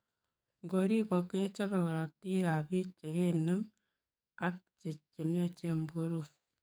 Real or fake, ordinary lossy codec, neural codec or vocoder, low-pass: fake; none; codec, 44.1 kHz, 2.6 kbps, SNAC; none